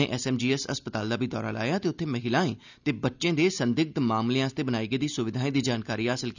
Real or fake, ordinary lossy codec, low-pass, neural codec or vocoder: real; none; 7.2 kHz; none